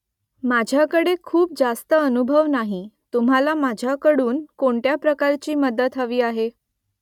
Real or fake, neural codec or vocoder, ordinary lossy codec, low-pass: real; none; none; 19.8 kHz